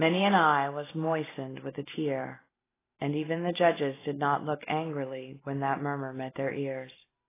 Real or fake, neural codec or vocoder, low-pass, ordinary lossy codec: real; none; 3.6 kHz; AAC, 16 kbps